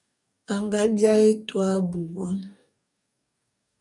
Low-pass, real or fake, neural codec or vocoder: 10.8 kHz; fake; codec, 44.1 kHz, 2.6 kbps, DAC